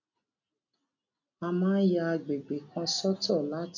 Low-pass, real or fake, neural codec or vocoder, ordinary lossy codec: 7.2 kHz; real; none; none